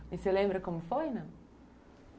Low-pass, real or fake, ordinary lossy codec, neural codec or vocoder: none; real; none; none